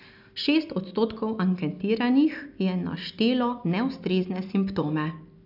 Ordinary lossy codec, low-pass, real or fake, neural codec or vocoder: none; 5.4 kHz; real; none